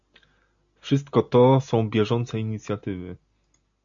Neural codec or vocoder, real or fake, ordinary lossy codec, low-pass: none; real; AAC, 64 kbps; 7.2 kHz